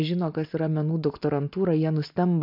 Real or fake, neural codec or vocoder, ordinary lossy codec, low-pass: real; none; MP3, 48 kbps; 5.4 kHz